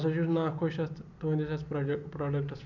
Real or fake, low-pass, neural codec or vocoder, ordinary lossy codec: real; 7.2 kHz; none; none